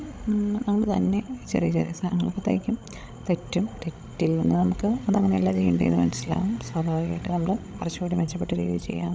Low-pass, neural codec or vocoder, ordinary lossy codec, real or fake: none; codec, 16 kHz, 16 kbps, FreqCodec, larger model; none; fake